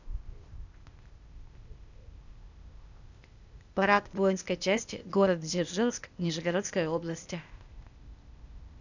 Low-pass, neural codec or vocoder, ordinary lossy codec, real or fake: 7.2 kHz; codec, 16 kHz, 0.8 kbps, ZipCodec; none; fake